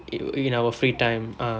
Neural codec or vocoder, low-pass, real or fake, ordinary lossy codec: none; none; real; none